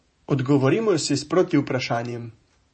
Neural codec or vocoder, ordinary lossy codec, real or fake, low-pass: vocoder, 48 kHz, 128 mel bands, Vocos; MP3, 32 kbps; fake; 9.9 kHz